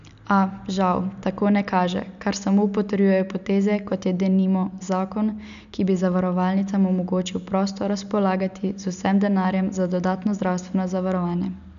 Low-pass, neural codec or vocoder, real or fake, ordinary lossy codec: 7.2 kHz; none; real; none